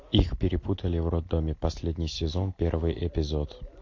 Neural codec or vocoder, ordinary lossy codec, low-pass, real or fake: none; MP3, 48 kbps; 7.2 kHz; real